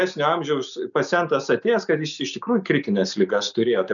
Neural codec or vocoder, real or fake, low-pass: none; real; 7.2 kHz